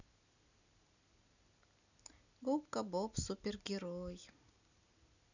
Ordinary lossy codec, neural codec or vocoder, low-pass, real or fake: none; none; 7.2 kHz; real